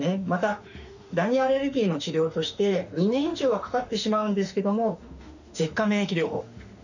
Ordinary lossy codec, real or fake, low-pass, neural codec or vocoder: none; fake; 7.2 kHz; autoencoder, 48 kHz, 32 numbers a frame, DAC-VAE, trained on Japanese speech